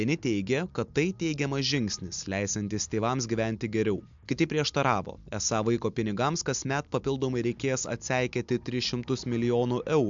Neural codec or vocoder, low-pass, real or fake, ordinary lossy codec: none; 7.2 kHz; real; MP3, 64 kbps